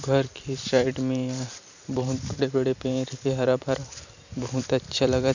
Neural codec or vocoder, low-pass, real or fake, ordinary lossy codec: vocoder, 44.1 kHz, 128 mel bands every 256 samples, BigVGAN v2; 7.2 kHz; fake; none